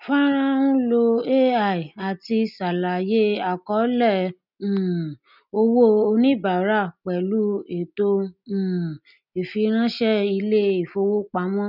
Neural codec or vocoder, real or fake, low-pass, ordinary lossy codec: none; real; 5.4 kHz; none